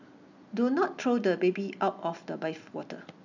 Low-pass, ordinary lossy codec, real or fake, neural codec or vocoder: 7.2 kHz; none; real; none